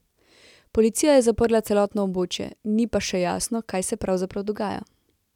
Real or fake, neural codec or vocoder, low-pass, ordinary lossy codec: real; none; 19.8 kHz; none